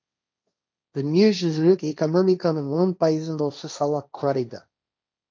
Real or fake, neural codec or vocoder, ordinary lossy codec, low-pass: fake; codec, 16 kHz, 1.1 kbps, Voila-Tokenizer; none; none